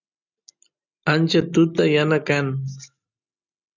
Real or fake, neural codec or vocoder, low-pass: real; none; 7.2 kHz